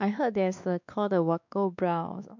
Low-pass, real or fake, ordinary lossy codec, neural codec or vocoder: 7.2 kHz; fake; none; codec, 16 kHz, 2 kbps, X-Codec, WavLM features, trained on Multilingual LibriSpeech